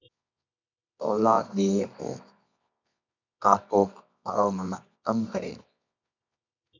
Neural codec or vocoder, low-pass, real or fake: codec, 24 kHz, 0.9 kbps, WavTokenizer, medium music audio release; 7.2 kHz; fake